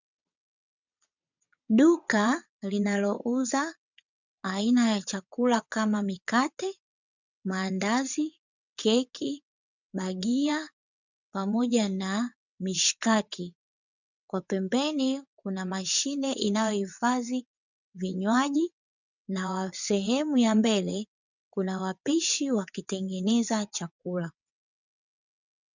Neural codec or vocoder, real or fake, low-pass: vocoder, 22.05 kHz, 80 mel bands, WaveNeXt; fake; 7.2 kHz